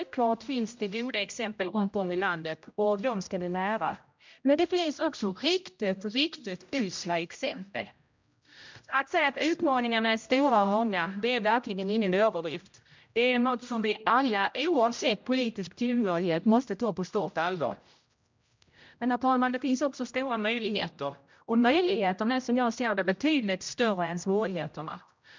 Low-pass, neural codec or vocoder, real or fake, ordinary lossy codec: 7.2 kHz; codec, 16 kHz, 0.5 kbps, X-Codec, HuBERT features, trained on general audio; fake; MP3, 64 kbps